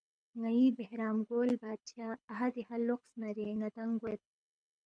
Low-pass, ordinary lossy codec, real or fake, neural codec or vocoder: 9.9 kHz; MP3, 96 kbps; fake; codec, 24 kHz, 6 kbps, HILCodec